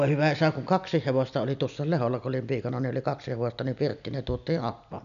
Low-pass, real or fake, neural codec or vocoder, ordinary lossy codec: 7.2 kHz; real; none; none